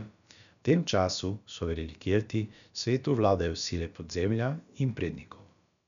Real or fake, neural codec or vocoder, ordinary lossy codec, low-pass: fake; codec, 16 kHz, about 1 kbps, DyCAST, with the encoder's durations; none; 7.2 kHz